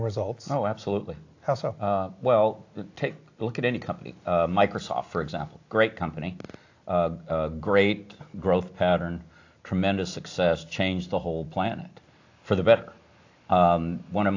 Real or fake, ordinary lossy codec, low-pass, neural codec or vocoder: real; AAC, 48 kbps; 7.2 kHz; none